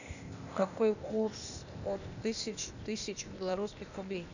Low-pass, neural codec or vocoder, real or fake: 7.2 kHz; codec, 16 kHz, 0.8 kbps, ZipCodec; fake